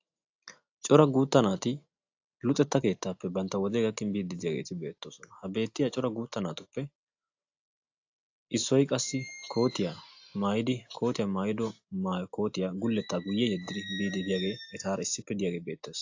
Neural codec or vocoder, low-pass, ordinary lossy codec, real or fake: none; 7.2 kHz; AAC, 48 kbps; real